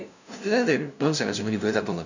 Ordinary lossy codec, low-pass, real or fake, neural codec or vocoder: none; 7.2 kHz; fake; codec, 16 kHz, 0.5 kbps, FunCodec, trained on LibriTTS, 25 frames a second